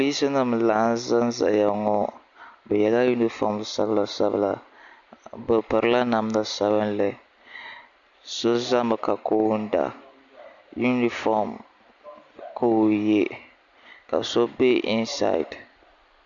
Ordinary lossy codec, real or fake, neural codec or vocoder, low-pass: Opus, 64 kbps; real; none; 7.2 kHz